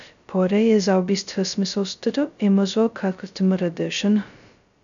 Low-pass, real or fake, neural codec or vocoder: 7.2 kHz; fake; codec, 16 kHz, 0.2 kbps, FocalCodec